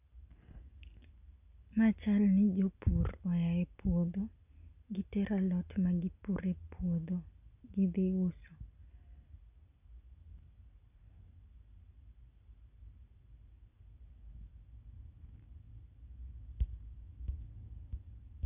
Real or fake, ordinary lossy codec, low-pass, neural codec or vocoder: real; none; 3.6 kHz; none